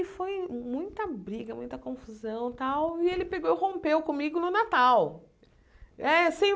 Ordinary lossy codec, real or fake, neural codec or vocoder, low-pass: none; real; none; none